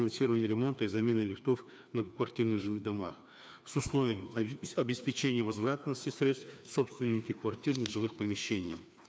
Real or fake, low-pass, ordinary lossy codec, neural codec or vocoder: fake; none; none; codec, 16 kHz, 2 kbps, FreqCodec, larger model